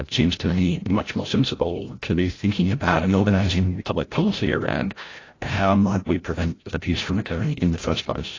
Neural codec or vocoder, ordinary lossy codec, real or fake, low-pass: codec, 16 kHz, 0.5 kbps, FreqCodec, larger model; AAC, 32 kbps; fake; 7.2 kHz